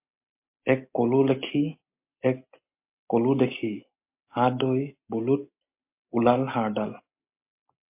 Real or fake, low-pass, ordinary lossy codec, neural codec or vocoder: real; 3.6 kHz; MP3, 32 kbps; none